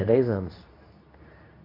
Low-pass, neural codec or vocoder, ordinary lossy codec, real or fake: 5.4 kHz; codec, 24 kHz, 0.9 kbps, WavTokenizer, medium speech release version 2; AAC, 24 kbps; fake